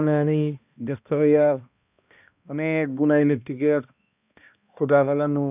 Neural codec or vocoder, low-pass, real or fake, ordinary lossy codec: codec, 16 kHz, 1 kbps, X-Codec, HuBERT features, trained on balanced general audio; 3.6 kHz; fake; none